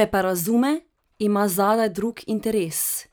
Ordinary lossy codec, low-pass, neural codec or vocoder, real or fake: none; none; vocoder, 44.1 kHz, 128 mel bands every 512 samples, BigVGAN v2; fake